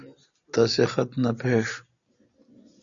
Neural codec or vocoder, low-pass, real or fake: none; 7.2 kHz; real